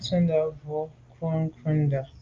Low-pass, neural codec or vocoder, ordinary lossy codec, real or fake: 7.2 kHz; none; Opus, 32 kbps; real